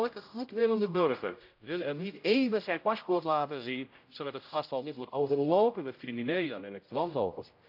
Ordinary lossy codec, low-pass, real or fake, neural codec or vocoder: AAC, 48 kbps; 5.4 kHz; fake; codec, 16 kHz, 0.5 kbps, X-Codec, HuBERT features, trained on general audio